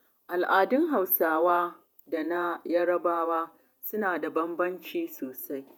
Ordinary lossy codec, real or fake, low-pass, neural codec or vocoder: none; fake; none; vocoder, 48 kHz, 128 mel bands, Vocos